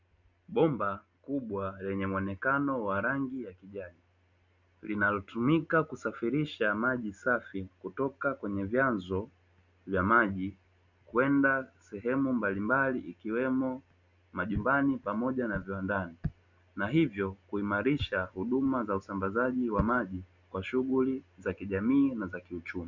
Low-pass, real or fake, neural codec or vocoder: 7.2 kHz; real; none